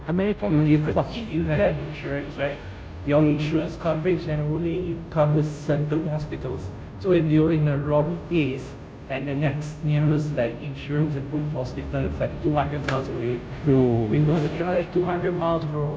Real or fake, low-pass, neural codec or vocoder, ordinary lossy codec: fake; none; codec, 16 kHz, 0.5 kbps, FunCodec, trained on Chinese and English, 25 frames a second; none